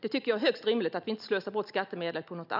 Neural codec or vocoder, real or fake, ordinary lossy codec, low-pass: none; real; none; 5.4 kHz